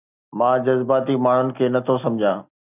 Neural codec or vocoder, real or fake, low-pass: none; real; 3.6 kHz